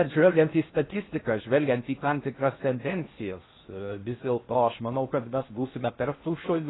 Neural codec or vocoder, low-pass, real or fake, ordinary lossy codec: codec, 16 kHz in and 24 kHz out, 0.6 kbps, FocalCodec, streaming, 4096 codes; 7.2 kHz; fake; AAC, 16 kbps